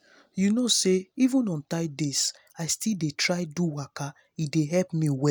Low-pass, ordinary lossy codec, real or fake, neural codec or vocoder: none; none; real; none